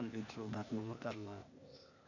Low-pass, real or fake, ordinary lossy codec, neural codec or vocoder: 7.2 kHz; fake; none; codec, 16 kHz, 0.8 kbps, ZipCodec